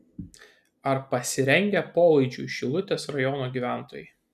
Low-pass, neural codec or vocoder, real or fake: 14.4 kHz; none; real